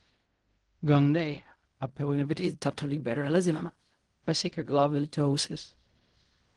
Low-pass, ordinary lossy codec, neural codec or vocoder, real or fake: 10.8 kHz; Opus, 24 kbps; codec, 16 kHz in and 24 kHz out, 0.4 kbps, LongCat-Audio-Codec, fine tuned four codebook decoder; fake